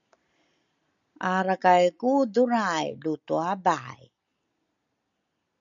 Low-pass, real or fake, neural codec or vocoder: 7.2 kHz; real; none